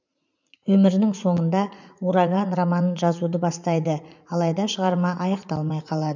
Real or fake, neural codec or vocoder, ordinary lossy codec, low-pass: fake; vocoder, 44.1 kHz, 80 mel bands, Vocos; none; 7.2 kHz